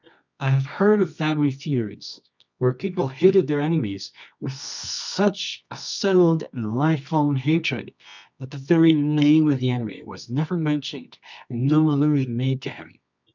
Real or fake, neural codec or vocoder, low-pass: fake; codec, 24 kHz, 0.9 kbps, WavTokenizer, medium music audio release; 7.2 kHz